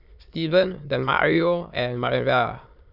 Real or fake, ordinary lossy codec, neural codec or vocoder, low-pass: fake; none; autoencoder, 22.05 kHz, a latent of 192 numbers a frame, VITS, trained on many speakers; 5.4 kHz